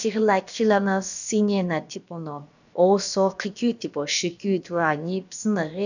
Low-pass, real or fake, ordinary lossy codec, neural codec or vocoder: 7.2 kHz; fake; none; codec, 16 kHz, about 1 kbps, DyCAST, with the encoder's durations